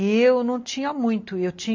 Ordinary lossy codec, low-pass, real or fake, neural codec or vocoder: MP3, 48 kbps; 7.2 kHz; real; none